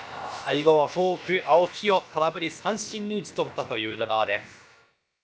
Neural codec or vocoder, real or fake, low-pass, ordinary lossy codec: codec, 16 kHz, about 1 kbps, DyCAST, with the encoder's durations; fake; none; none